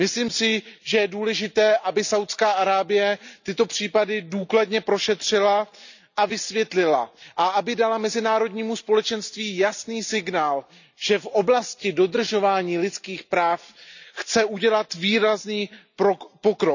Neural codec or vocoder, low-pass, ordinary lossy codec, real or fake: none; 7.2 kHz; none; real